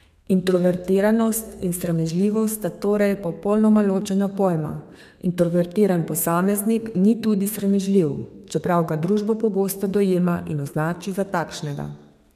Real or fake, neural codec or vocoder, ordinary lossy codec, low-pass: fake; codec, 32 kHz, 1.9 kbps, SNAC; none; 14.4 kHz